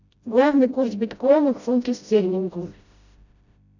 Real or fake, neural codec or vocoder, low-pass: fake; codec, 16 kHz, 0.5 kbps, FreqCodec, smaller model; 7.2 kHz